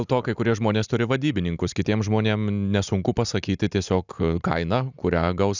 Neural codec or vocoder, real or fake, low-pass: none; real; 7.2 kHz